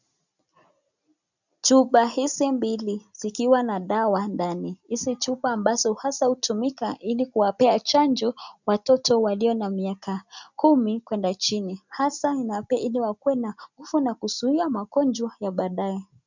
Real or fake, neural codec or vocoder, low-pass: real; none; 7.2 kHz